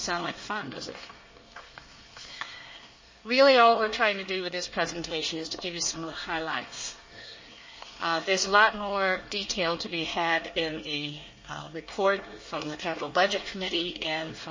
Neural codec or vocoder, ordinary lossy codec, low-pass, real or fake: codec, 24 kHz, 1 kbps, SNAC; MP3, 32 kbps; 7.2 kHz; fake